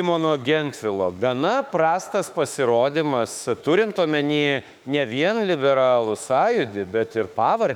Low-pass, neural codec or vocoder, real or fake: 19.8 kHz; autoencoder, 48 kHz, 32 numbers a frame, DAC-VAE, trained on Japanese speech; fake